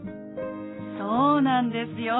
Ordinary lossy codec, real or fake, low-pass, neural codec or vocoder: AAC, 16 kbps; real; 7.2 kHz; none